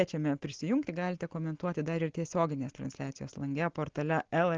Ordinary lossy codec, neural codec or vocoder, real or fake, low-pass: Opus, 16 kbps; none; real; 7.2 kHz